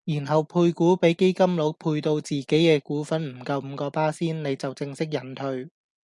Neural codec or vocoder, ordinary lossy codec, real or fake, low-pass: none; MP3, 96 kbps; real; 10.8 kHz